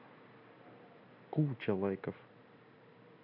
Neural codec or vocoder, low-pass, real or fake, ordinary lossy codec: none; 5.4 kHz; real; none